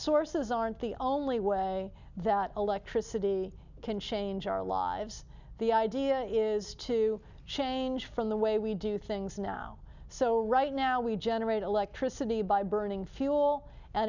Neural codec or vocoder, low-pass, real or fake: none; 7.2 kHz; real